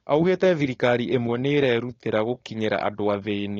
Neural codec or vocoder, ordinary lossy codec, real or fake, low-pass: codec, 16 kHz, 4.8 kbps, FACodec; AAC, 32 kbps; fake; 7.2 kHz